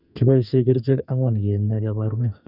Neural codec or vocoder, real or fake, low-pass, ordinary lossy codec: codec, 44.1 kHz, 2.6 kbps, SNAC; fake; 5.4 kHz; none